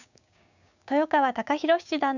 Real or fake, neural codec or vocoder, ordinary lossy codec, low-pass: fake; codec, 16 kHz, 6 kbps, DAC; none; 7.2 kHz